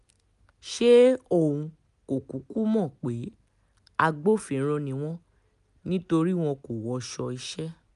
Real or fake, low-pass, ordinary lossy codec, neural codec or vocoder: real; 10.8 kHz; none; none